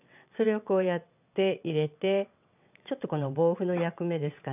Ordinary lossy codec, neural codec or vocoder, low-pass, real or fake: none; vocoder, 22.05 kHz, 80 mel bands, WaveNeXt; 3.6 kHz; fake